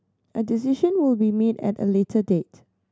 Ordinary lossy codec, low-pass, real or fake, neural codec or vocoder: none; none; real; none